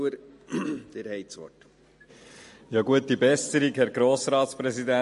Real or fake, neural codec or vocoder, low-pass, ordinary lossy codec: real; none; 14.4 kHz; MP3, 48 kbps